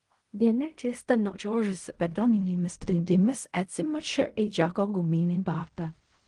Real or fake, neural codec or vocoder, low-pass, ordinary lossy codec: fake; codec, 16 kHz in and 24 kHz out, 0.4 kbps, LongCat-Audio-Codec, fine tuned four codebook decoder; 10.8 kHz; Opus, 24 kbps